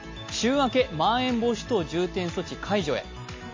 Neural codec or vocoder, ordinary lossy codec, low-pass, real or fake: none; MP3, 32 kbps; 7.2 kHz; real